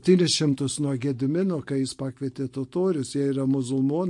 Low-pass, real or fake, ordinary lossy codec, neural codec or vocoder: 10.8 kHz; real; MP3, 48 kbps; none